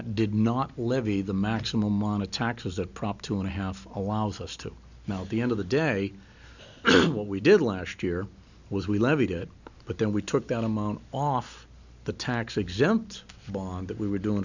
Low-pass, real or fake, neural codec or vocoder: 7.2 kHz; real; none